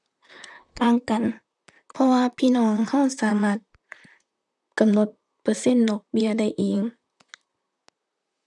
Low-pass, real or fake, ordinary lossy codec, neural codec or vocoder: 10.8 kHz; fake; none; vocoder, 44.1 kHz, 128 mel bands, Pupu-Vocoder